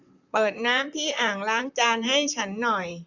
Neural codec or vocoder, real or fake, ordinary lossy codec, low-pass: vocoder, 44.1 kHz, 128 mel bands, Pupu-Vocoder; fake; none; 7.2 kHz